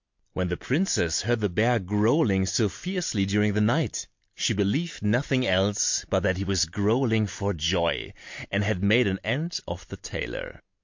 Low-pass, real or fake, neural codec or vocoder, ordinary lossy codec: 7.2 kHz; real; none; MP3, 48 kbps